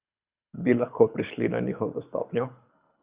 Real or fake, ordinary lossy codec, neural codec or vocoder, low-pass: fake; Opus, 64 kbps; codec, 24 kHz, 6 kbps, HILCodec; 3.6 kHz